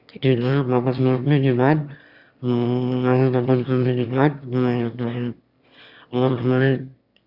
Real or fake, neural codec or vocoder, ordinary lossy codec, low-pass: fake; autoencoder, 22.05 kHz, a latent of 192 numbers a frame, VITS, trained on one speaker; Opus, 64 kbps; 5.4 kHz